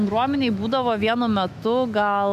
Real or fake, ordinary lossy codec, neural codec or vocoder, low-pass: fake; MP3, 96 kbps; autoencoder, 48 kHz, 128 numbers a frame, DAC-VAE, trained on Japanese speech; 14.4 kHz